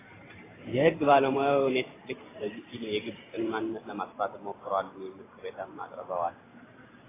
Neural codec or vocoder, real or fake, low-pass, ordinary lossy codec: vocoder, 44.1 kHz, 128 mel bands every 512 samples, BigVGAN v2; fake; 3.6 kHz; AAC, 16 kbps